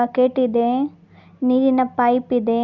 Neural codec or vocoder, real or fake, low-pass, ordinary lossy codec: none; real; 7.2 kHz; Opus, 64 kbps